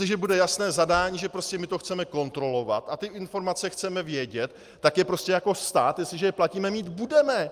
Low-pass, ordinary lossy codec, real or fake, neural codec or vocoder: 14.4 kHz; Opus, 32 kbps; real; none